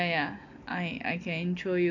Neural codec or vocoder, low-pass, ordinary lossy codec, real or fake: none; 7.2 kHz; none; real